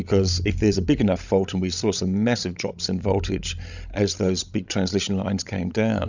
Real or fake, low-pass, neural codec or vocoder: fake; 7.2 kHz; codec, 16 kHz, 16 kbps, FreqCodec, larger model